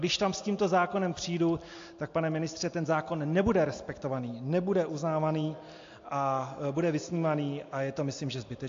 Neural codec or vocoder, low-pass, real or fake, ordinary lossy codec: none; 7.2 kHz; real; AAC, 48 kbps